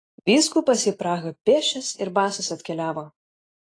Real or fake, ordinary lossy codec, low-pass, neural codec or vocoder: real; AAC, 32 kbps; 9.9 kHz; none